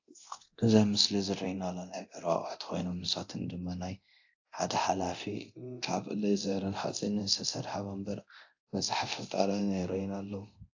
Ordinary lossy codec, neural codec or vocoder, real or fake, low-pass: AAC, 48 kbps; codec, 24 kHz, 0.9 kbps, DualCodec; fake; 7.2 kHz